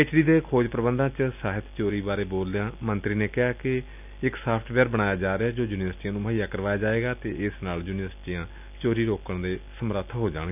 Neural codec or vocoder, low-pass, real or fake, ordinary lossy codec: none; 3.6 kHz; real; none